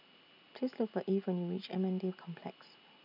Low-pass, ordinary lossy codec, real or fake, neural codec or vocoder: 5.4 kHz; none; real; none